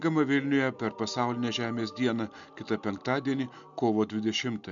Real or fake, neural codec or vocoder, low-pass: real; none; 7.2 kHz